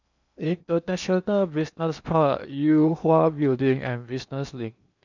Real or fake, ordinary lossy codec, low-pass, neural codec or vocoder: fake; none; 7.2 kHz; codec, 16 kHz in and 24 kHz out, 0.8 kbps, FocalCodec, streaming, 65536 codes